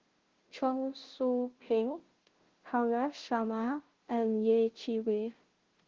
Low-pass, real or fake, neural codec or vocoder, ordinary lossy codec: 7.2 kHz; fake; codec, 16 kHz, 0.5 kbps, FunCodec, trained on Chinese and English, 25 frames a second; Opus, 16 kbps